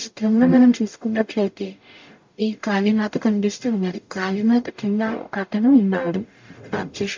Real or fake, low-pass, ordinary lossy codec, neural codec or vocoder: fake; 7.2 kHz; MP3, 64 kbps; codec, 44.1 kHz, 0.9 kbps, DAC